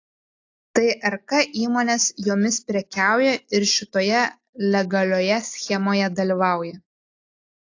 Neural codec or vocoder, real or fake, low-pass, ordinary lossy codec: none; real; 7.2 kHz; AAC, 48 kbps